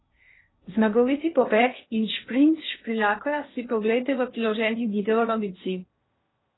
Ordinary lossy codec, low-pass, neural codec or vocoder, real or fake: AAC, 16 kbps; 7.2 kHz; codec, 16 kHz in and 24 kHz out, 0.6 kbps, FocalCodec, streaming, 2048 codes; fake